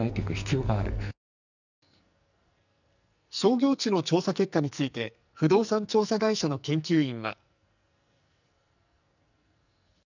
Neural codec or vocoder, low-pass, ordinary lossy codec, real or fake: codec, 44.1 kHz, 2.6 kbps, SNAC; 7.2 kHz; none; fake